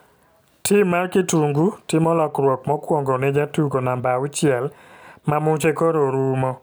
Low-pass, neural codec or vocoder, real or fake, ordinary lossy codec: none; none; real; none